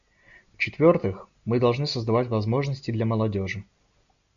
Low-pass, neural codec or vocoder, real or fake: 7.2 kHz; none; real